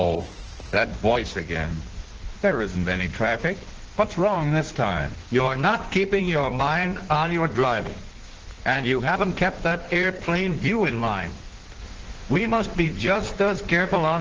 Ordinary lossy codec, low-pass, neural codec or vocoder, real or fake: Opus, 16 kbps; 7.2 kHz; codec, 16 kHz in and 24 kHz out, 1.1 kbps, FireRedTTS-2 codec; fake